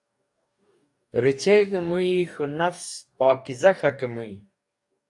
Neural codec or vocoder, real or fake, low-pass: codec, 44.1 kHz, 2.6 kbps, DAC; fake; 10.8 kHz